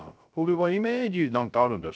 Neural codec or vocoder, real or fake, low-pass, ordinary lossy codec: codec, 16 kHz, 0.3 kbps, FocalCodec; fake; none; none